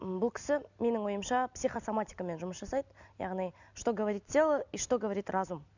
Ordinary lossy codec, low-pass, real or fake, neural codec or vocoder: none; 7.2 kHz; real; none